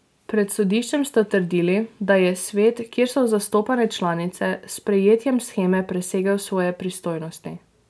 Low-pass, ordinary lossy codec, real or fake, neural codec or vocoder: none; none; real; none